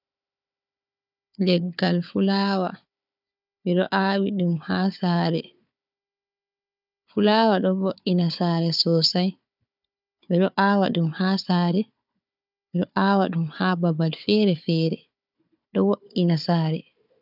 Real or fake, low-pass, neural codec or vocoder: fake; 5.4 kHz; codec, 16 kHz, 4 kbps, FunCodec, trained on Chinese and English, 50 frames a second